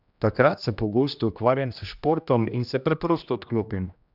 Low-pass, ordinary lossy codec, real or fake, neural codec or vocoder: 5.4 kHz; none; fake; codec, 16 kHz, 1 kbps, X-Codec, HuBERT features, trained on general audio